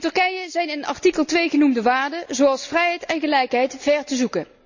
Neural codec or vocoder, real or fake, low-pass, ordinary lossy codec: none; real; 7.2 kHz; none